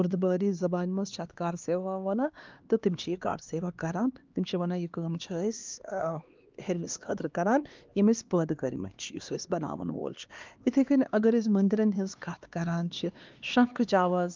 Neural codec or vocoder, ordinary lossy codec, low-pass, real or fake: codec, 16 kHz, 2 kbps, X-Codec, HuBERT features, trained on LibriSpeech; Opus, 32 kbps; 7.2 kHz; fake